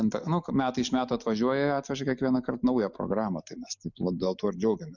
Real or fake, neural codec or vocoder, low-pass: real; none; 7.2 kHz